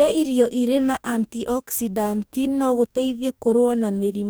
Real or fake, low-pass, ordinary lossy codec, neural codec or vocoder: fake; none; none; codec, 44.1 kHz, 2.6 kbps, DAC